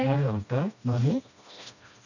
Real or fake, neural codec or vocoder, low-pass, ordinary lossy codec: fake; codec, 16 kHz, 1 kbps, FreqCodec, smaller model; 7.2 kHz; none